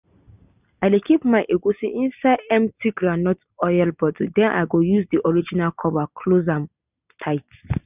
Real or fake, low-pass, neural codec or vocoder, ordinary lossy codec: real; 3.6 kHz; none; none